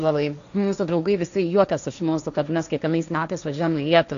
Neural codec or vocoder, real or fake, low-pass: codec, 16 kHz, 1.1 kbps, Voila-Tokenizer; fake; 7.2 kHz